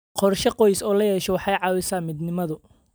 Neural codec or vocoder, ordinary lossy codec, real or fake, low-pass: vocoder, 44.1 kHz, 128 mel bands every 256 samples, BigVGAN v2; none; fake; none